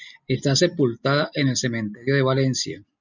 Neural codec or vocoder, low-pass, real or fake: none; 7.2 kHz; real